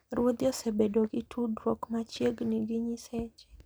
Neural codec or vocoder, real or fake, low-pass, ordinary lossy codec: none; real; none; none